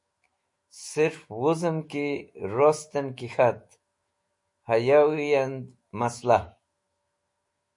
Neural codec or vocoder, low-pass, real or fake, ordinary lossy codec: autoencoder, 48 kHz, 128 numbers a frame, DAC-VAE, trained on Japanese speech; 10.8 kHz; fake; MP3, 48 kbps